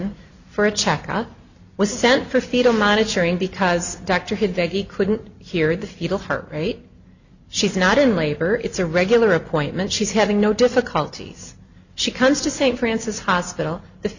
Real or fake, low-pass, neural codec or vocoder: real; 7.2 kHz; none